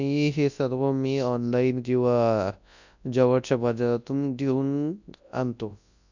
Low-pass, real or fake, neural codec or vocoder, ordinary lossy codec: 7.2 kHz; fake; codec, 24 kHz, 0.9 kbps, WavTokenizer, large speech release; none